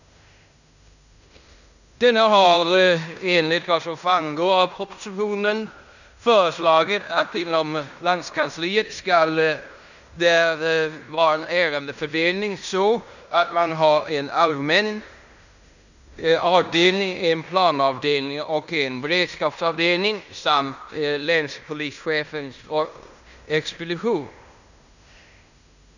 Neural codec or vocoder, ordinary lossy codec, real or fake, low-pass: codec, 16 kHz in and 24 kHz out, 0.9 kbps, LongCat-Audio-Codec, fine tuned four codebook decoder; none; fake; 7.2 kHz